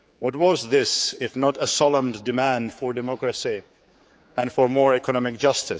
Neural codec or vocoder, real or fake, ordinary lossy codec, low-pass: codec, 16 kHz, 4 kbps, X-Codec, HuBERT features, trained on general audio; fake; none; none